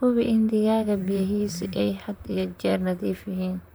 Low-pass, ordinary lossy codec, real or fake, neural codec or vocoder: none; none; fake; vocoder, 44.1 kHz, 128 mel bands, Pupu-Vocoder